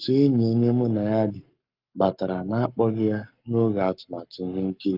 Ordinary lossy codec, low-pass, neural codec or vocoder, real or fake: Opus, 32 kbps; 5.4 kHz; codec, 44.1 kHz, 7.8 kbps, Pupu-Codec; fake